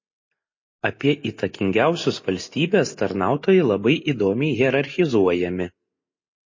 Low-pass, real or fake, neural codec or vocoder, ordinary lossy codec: 7.2 kHz; real; none; MP3, 32 kbps